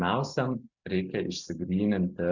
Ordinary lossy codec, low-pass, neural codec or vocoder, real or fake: Opus, 64 kbps; 7.2 kHz; none; real